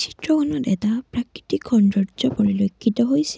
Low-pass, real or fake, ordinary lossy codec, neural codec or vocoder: none; real; none; none